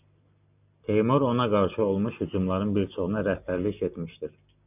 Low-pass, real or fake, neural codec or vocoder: 3.6 kHz; real; none